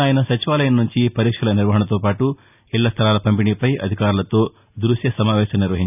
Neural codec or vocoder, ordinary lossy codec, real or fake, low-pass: none; none; real; 3.6 kHz